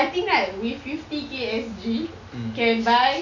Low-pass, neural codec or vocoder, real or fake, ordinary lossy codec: 7.2 kHz; none; real; none